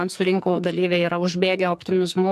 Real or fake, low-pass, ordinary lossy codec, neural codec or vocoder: fake; 14.4 kHz; AAC, 96 kbps; codec, 44.1 kHz, 2.6 kbps, SNAC